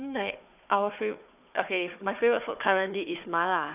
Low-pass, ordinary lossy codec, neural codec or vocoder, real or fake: 3.6 kHz; none; codec, 16 kHz, 4 kbps, FunCodec, trained on Chinese and English, 50 frames a second; fake